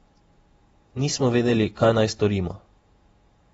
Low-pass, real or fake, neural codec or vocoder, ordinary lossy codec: 19.8 kHz; fake; vocoder, 48 kHz, 128 mel bands, Vocos; AAC, 24 kbps